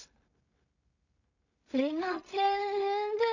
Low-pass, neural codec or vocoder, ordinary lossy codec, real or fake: 7.2 kHz; codec, 16 kHz in and 24 kHz out, 0.4 kbps, LongCat-Audio-Codec, two codebook decoder; none; fake